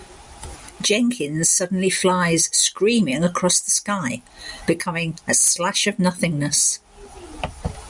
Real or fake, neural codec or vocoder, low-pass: real; none; 10.8 kHz